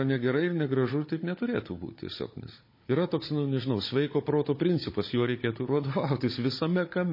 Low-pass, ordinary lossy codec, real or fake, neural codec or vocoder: 5.4 kHz; MP3, 24 kbps; fake; codec, 16 kHz, 16 kbps, FunCodec, trained on Chinese and English, 50 frames a second